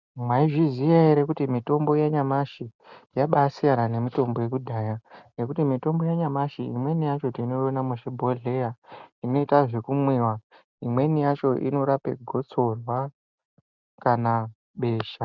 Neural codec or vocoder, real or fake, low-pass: none; real; 7.2 kHz